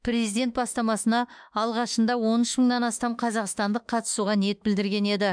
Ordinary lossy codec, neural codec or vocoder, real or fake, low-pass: none; autoencoder, 48 kHz, 32 numbers a frame, DAC-VAE, trained on Japanese speech; fake; 9.9 kHz